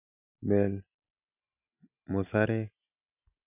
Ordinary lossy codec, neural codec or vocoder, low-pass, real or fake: none; none; 3.6 kHz; real